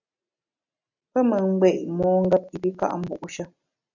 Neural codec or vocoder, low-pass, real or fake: none; 7.2 kHz; real